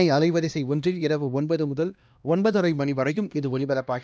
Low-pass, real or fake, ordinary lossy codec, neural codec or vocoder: none; fake; none; codec, 16 kHz, 1 kbps, X-Codec, HuBERT features, trained on LibriSpeech